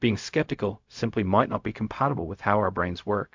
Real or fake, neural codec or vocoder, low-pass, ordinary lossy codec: fake; codec, 16 kHz, 0.4 kbps, LongCat-Audio-Codec; 7.2 kHz; MP3, 64 kbps